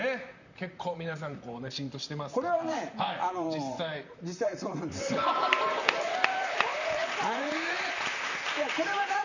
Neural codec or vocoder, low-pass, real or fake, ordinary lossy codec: vocoder, 44.1 kHz, 128 mel bands, Pupu-Vocoder; 7.2 kHz; fake; none